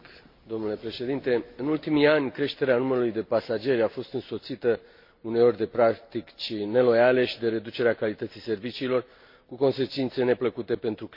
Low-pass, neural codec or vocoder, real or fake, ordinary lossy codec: 5.4 kHz; none; real; MP3, 32 kbps